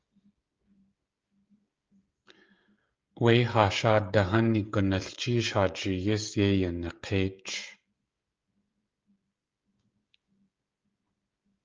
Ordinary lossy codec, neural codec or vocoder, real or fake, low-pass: Opus, 24 kbps; codec, 16 kHz, 16 kbps, FreqCodec, smaller model; fake; 7.2 kHz